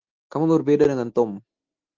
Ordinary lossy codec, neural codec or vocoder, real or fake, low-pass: Opus, 16 kbps; none; real; 7.2 kHz